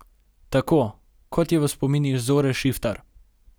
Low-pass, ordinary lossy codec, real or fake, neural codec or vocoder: none; none; real; none